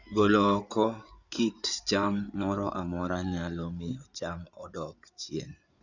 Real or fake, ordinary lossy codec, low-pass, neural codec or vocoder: fake; none; 7.2 kHz; codec, 16 kHz in and 24 kHz out, 2.2 kbps, FireRedTTS-2 codec